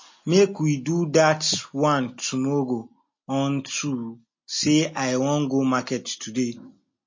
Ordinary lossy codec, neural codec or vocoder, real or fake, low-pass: MP3, 32 kbps; none; real; 7.2 kHz